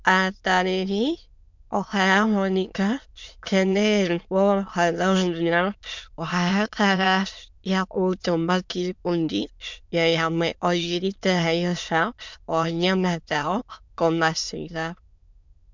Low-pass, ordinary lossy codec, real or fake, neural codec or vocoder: 7.2 kHz; MP3, 64 kbps; fake; autoencoder, 22.05 kHz, a latent of 192 numbers a frame, VITS, trained on many speakers